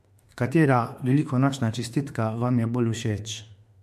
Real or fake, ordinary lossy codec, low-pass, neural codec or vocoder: fake; MP3, 64 kbps; 14.4 kHz; autoencoder, 48 kHz, 32 numbers a frame, DAC-VAE, trained on Japanese speech